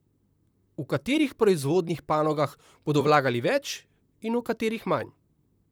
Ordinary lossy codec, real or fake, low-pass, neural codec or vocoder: none; fake; none; vocoder, 44.1 kHz, 128 mel bands, Pupu-Vocoder